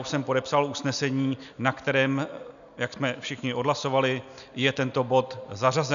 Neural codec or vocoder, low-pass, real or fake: none; 7.2 kHz; real